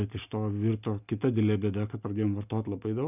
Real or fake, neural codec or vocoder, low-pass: real; none; 3.6 kHz